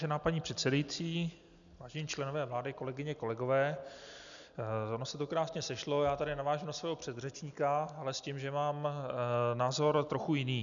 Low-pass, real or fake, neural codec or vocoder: 7.2 kHz; real; none